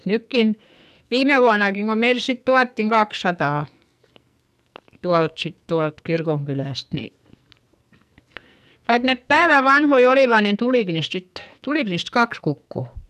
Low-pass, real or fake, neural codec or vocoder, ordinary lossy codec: 14.4 kHz; fake; codec, 44.1 kHz, 2.6 kbps, SNAC; none